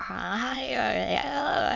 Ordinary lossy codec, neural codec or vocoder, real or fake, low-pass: MP3, 64 kbps; autoencoder, 22.05 kHz, a latent of 192 numbers a frame, VITS, trained on many speakers; fake; 7.2 kHz